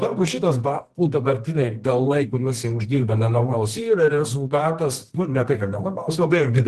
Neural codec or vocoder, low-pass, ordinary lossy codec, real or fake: codec, 24 kHz, 0.9 kbps, WavTokenizer, medium music audio release; 10.8 kHz; Opus, 16 kbps; fake